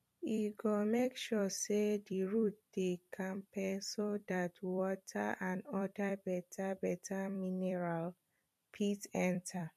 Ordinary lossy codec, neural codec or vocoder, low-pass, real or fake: MP3, 64 kbps; vocoder, 44.1 kHz, 128 mel bands every 256 samples, BigVGAN v2; 14.4 kHz; fake